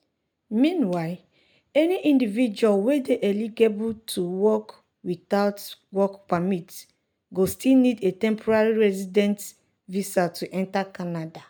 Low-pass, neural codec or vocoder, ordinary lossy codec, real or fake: none; none; none; real